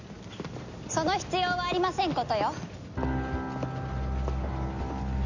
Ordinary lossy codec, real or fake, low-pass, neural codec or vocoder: none; real; 7.2 kHz; none